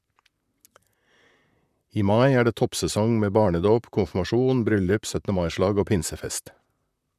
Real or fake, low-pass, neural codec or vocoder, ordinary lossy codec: fake; 14.4 kHz; vocoder, 44.1 kHz, 128 mel bands, Pupu-Vocoder; none